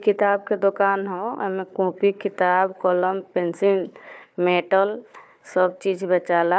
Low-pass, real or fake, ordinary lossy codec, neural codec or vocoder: none; fake; none; codec, 16 kHz, 4 kbps, FunCodec, trained on Chinese and English, 50 frames a second